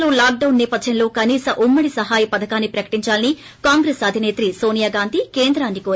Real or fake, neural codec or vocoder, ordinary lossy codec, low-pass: real; none; none; none